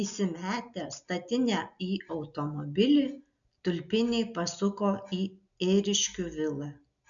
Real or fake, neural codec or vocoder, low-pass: real; none; 7.2 kHz